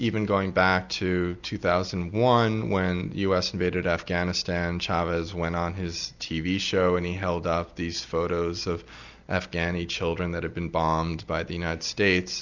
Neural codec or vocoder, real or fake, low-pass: none; real; 7.2 kHz